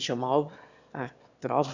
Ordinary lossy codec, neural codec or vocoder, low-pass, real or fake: none; autoencoder, 22.05 kHz, a latent of 192 numbers a frame, VITS, trained on one speaker; 7.2 kHz; fake